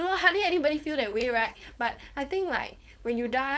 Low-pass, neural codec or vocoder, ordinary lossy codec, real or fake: none; codec, 16 kHz, 4.8 kbps, FACodec; none; fake